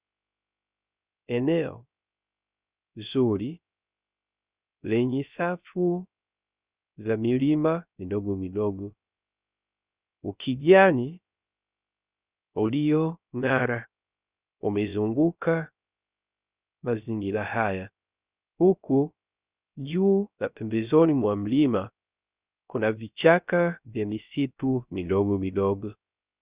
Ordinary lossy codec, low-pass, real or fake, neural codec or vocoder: Opus, 64 kbps; 3.6 kHz; fake; codec, 16 kHz, 0.3 kbps, FocalCodec